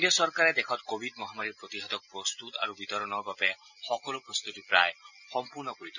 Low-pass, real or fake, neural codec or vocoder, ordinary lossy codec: 7.2 kHz; real; none; none